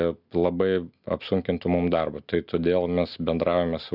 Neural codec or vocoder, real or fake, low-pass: none; real; 5.4 kHz